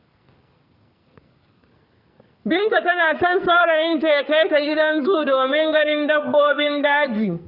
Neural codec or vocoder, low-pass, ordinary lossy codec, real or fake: codec, 44.1 kHz, 2.6 kbps, SNAC; 5.4 kHz; Opus, 64 kbps; fake